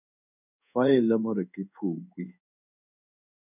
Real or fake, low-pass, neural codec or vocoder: fake; 3.6 kHz; codec, 16 kHz in and 24 kHz out, 1 kbps, XY-Tokenizer